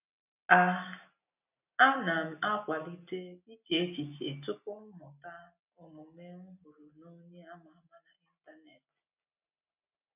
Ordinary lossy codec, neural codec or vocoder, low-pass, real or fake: none; none; 3.6 kHz; real